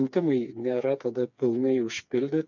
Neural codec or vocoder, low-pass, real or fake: codec, 16 kHz, 4 kbps, FreqCodec, smaller model; 7.2 kHz; fake